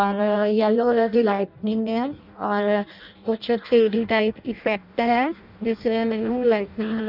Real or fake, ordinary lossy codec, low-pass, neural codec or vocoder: fake; none; 5.4 kHz; codec, 16 kHz in and 24 kHz out, 0.6 kbps, FireRedTTS-2 codec